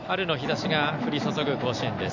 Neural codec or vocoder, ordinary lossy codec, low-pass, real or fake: none; none; 7.2 kHz; real